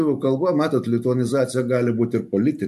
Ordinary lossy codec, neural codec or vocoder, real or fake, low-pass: MP3, 64 kbps; none; real; 14.4 kHz